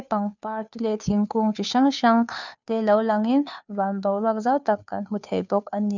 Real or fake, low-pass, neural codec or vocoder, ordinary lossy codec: fake; 7.2 kHz; codec, 16 kHz, 2 kbps, FunCodec, trained on Chinese and English, 25 frames a second; none